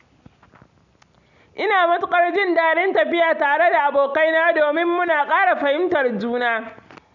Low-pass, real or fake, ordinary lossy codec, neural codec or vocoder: 7.2 kHz; real; none; none